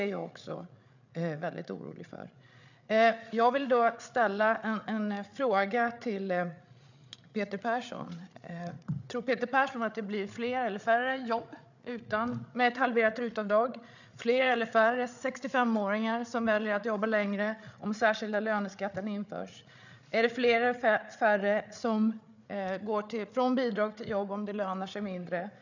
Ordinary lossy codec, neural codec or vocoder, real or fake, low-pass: none; codec, 16 kHz, 8 kbps, FreqCodec, larger model; fake; 7.2 kHz